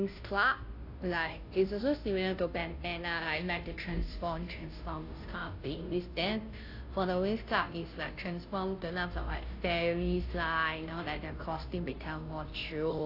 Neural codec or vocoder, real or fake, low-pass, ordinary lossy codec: codec, 16 kHz, 0.5 kbps, FunCodec, trained on Chinese and English, 25 frames a second; fake; 5.4 kHz; AAC, 32 kbps